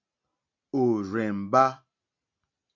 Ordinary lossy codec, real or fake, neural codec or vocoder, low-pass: AAC, 48 kbps; real; none; 7.2 kHz